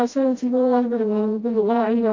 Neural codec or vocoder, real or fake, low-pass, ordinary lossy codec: codec, 16 kHz, 0.5 kbps, FreqCodec, smaller model; fake; 7.2 kHz; AAC, 48 kbps